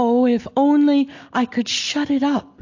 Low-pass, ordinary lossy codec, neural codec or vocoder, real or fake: 7.2 kHz; AAC, 48 kbps; none; real